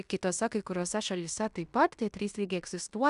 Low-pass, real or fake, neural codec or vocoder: 10.8 kHz; fake; codec, 16 kHz in and 24 kHz out, 0.9 kbps, LongCat-Audio-Codec, fine tuned four codebook decoder